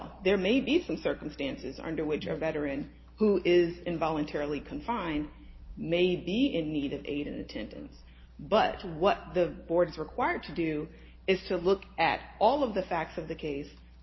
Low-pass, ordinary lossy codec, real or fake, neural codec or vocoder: 7.2 kHz; MP3, 24 kbps; real; none